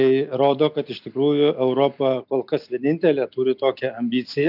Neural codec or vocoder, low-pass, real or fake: none; 5.4 kHz; real